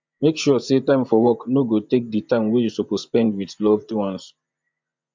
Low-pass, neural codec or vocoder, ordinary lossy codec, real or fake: 7.2 kHz; vocoder, 24 kHz, 100 mel bands, Vocos; MP3, 64 kbps; fake